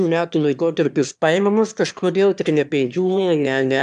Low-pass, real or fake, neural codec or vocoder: 9.9 kHz; fake; autoencoder, 22.05 kHz, a latent of 192 numbers a frame, VITS, trained on one speaker